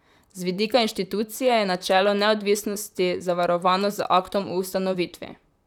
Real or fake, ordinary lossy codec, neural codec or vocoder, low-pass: fake; none; vocoder, 44.1 kHz, 128 mel bands, Pupu-Vocoder; 19.8 kHz